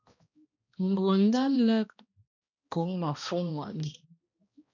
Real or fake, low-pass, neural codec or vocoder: fake; 7.2 kHz; codec, 16 kHz, 1 kbps, X-Codec, HuBERT features, trained on balanced general audio